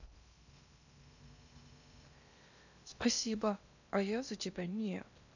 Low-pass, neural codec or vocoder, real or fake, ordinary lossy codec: 7.2 kHz; codec, 16 kHz in and 24 kHz out, 0.8 kbps, FocalCodec, streaming, 65536 codes; fake; none